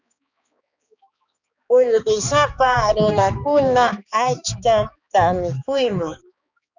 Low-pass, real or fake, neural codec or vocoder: 7.2 kHz; fake; codec, 16 kHz, 2 kbps, X-Codec, HuBERT features, trained on balanced general audio